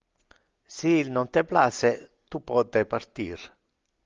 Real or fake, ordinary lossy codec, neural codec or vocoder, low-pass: real; Opus, 32 kbps; none; 7.2 kHz